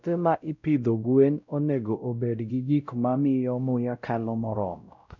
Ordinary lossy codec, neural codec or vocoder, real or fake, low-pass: none; codec, 16 kHz, 0.5 kbps, X-Codec, WavLM features, trained on Multilingual LibriSpeech; fake; 7.2 kHz